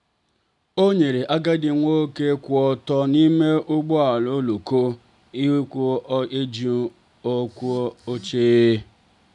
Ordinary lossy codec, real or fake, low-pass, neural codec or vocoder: none; real; 10.8 kHz; none